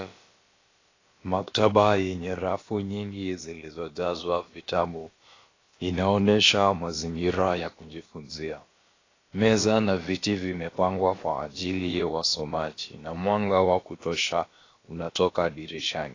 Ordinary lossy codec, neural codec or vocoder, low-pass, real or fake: AAC, 32 kbps; codec, 16 kHz, about 1 kbps, DyCAST, with the encoder's durations; 7.2 kHz; fake